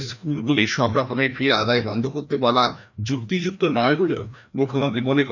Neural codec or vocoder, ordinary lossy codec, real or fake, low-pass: codec, 16 kHz, 1 kbps, FreqCodec, larger model; none; fake; 7.2 kHz